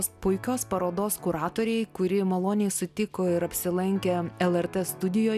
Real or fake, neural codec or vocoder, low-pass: real; none; 14.4 kHz